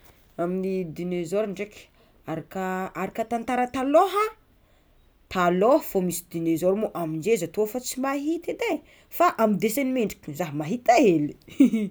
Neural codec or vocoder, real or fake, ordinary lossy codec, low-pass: none; real; none; none